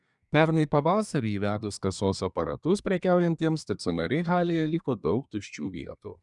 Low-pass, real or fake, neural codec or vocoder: 10.8 kHz; fake; codec, 24 kHz, 1 kbps, SNAC